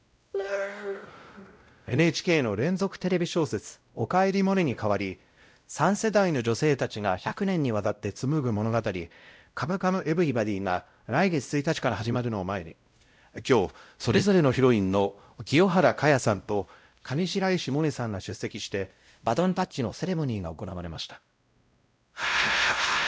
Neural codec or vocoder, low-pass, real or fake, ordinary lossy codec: codec, 16 kHz, 0.5 kbps, X-Codec, WavLM features, trained on Multilingual LibriSpeech; none; fake; none